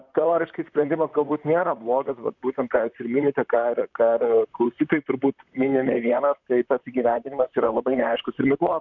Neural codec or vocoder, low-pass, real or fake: vocoder, 44.1 kHz, 128 mel bands, Pupu-Vocoder; 7.2 kHz; fake